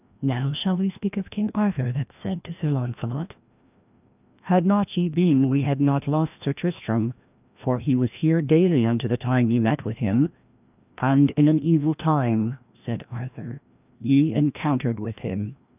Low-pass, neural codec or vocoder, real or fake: 3.6 kHz; codec, 16 kHz, 1 kbps, FreqCodec, larger model; fake